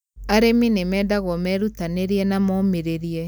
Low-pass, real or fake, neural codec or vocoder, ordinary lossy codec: none; real; none; none